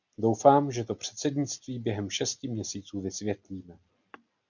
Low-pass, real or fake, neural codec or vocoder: 7.2 kHz; real; none